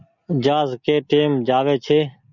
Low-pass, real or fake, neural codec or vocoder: 7.2 kHz; real; none